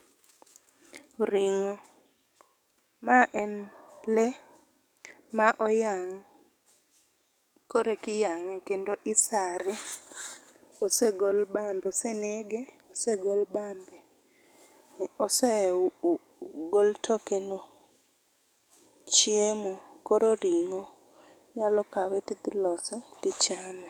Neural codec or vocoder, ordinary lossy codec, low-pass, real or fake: codec, 44.1 kHz, 7.8 kbps, DAC; none; 19.8 kHz; fake